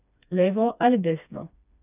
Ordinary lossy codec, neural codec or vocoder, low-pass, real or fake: none; codec, 16 kHz, 2 kbps, FreqCodec, smaller model; 3.6 kHz; fake